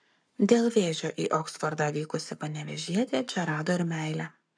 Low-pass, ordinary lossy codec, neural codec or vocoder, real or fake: 9.9 kHz; MP3, 96 kbps; codec, 44.1 kHz, 7.8 kbps, Pupu-Codec; fake